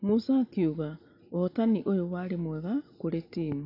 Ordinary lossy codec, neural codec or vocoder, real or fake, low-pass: AAC, 32 kbps; none; real; 5.4 kHz